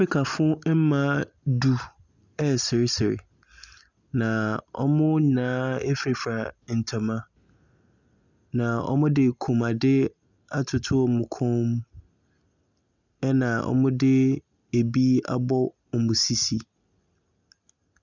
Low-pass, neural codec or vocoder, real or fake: 7.2 kHz; none; real